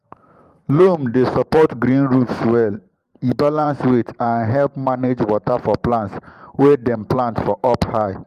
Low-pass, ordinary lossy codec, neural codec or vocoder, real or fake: 14.4 kHz; Opus, 32 kbps; vocoder, 44.1 kHz, 128 mel bands every 512 samples, BigVGAN v2; fake